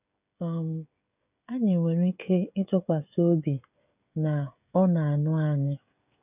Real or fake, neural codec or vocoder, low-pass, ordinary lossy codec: fake; codec, 16 kHz, 16 kbps, FreqCodec, smaller model; 3.6 kHz; none